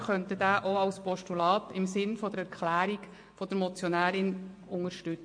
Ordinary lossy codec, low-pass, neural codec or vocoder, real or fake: MP3, 48 kbps; 9.9 kHz; vocoder, 24 kHz, 100 mel bands, Vocos; fake